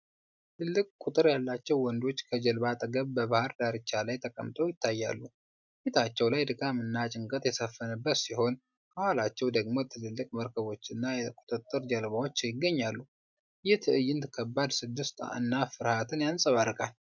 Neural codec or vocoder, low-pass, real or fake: none; 7.2 kHz; real